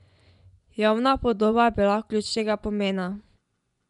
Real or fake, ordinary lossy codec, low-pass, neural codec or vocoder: fake; none; 10.8 kHz; vocoder, 24 kHz, 100 mel bands, Vocos